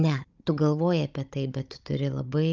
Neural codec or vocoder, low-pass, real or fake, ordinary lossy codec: codec, 16 kHz, 16 kbps, FunCodec, trained on Chinese and English, 50 frames a second; 7.2 kHz; fake; Opus, 24 kbps